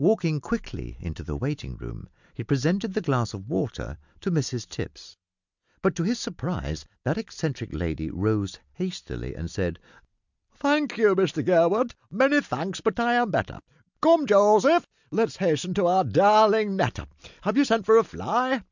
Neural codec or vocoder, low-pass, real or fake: none; 7.2 kHz; real